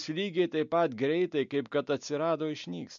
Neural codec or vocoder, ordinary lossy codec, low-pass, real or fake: none; MP3, 64 kbps; 7.2 kHz; real